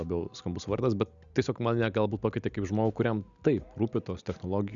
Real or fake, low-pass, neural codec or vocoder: real; 7.2 kHz; none